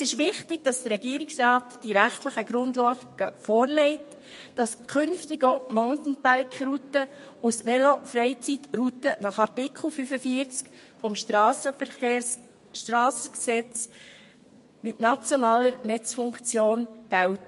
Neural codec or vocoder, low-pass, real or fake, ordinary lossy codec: codec, 32 kHz, 1.9 kbps, SNAC; 14.4 kHz; fake; MP3, 48 kbps